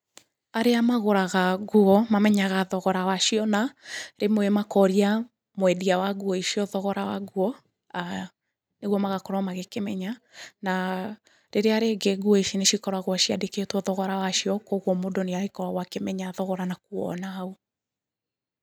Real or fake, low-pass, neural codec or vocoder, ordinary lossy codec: real; 19.8 kHz; none; none